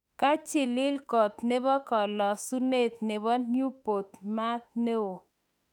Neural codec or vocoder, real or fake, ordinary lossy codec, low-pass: autoencoder, 48 kHz, 32 numbers a frame, DAC-VAE, trained on Japanese speech; fake; none; 19.8 kHz